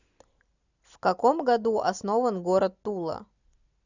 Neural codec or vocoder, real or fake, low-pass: none; real; 7.2 kHz